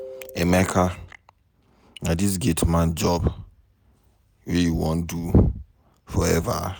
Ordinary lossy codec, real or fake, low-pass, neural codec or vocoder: none; real; none; none